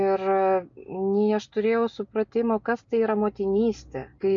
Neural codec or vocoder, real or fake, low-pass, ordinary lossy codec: none; real; 7.2 kHz; AAC, 64 kbps